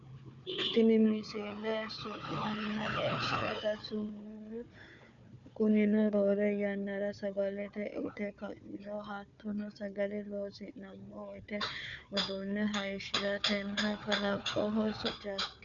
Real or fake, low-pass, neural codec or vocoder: fake; 7.2 kHz; codec, 16 kHz, 4 kbps, FunCodec, trained on Chinese and English, 50 frames a second